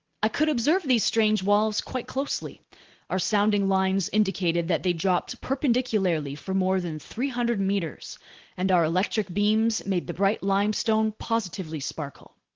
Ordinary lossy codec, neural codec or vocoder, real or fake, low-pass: Opus, 16 kbps; none; real; 7.2 kHz